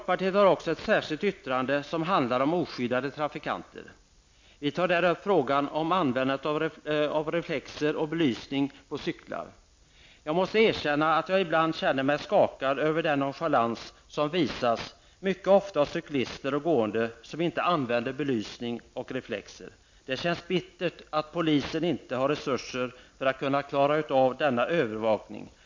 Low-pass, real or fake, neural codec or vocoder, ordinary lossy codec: 7.2 kHz; real; none; MP3, 48 kbps